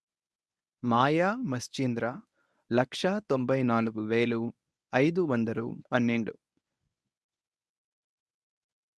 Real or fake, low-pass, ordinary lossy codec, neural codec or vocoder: fake; none; none; codec, 24 kHz, 0.9 kbps, WavTokenizer, medium speech release version 1